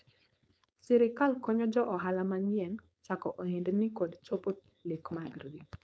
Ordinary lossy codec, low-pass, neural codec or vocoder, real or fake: none; none; codec, 16 kHz, 4.8 kbps, FACodec; fake